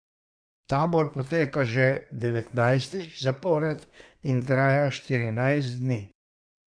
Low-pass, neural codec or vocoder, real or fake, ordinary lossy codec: 9.9 kHz; codec, 24 kHz, 1 kbps, SNAC; fake; none